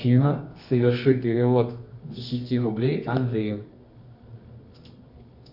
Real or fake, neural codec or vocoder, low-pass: fake; codec, 24 kHz, 0.9 kbps, WavTokenizer, medium music audio release; 5.4 kHz